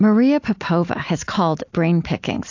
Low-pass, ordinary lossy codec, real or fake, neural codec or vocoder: 7.2 kHz; AAC, 48 kbps; real; none